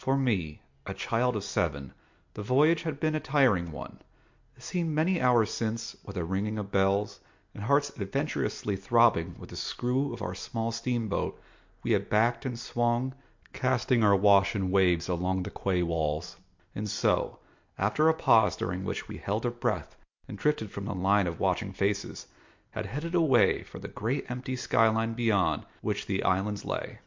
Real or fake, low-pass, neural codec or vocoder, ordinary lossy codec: real; 7.2 kHz; none; MP3, 48 kbps